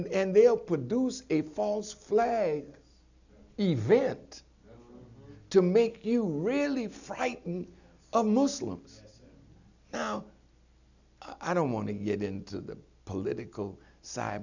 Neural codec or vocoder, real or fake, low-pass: none; real; 7.2 kHz